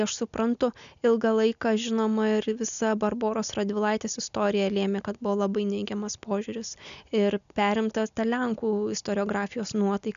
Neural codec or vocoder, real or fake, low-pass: none; real; 7.2 kHz